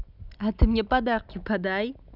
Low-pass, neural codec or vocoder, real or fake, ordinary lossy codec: 5.4 kHz; none; real; none